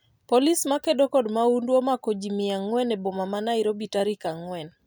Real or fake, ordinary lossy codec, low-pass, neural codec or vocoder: real; none; none; none